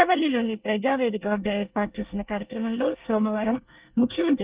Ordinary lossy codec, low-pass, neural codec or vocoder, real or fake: Opus, 32 kbps; 3.6 kHz; codec, 24 kHz, 1 kbps, SNAC; fake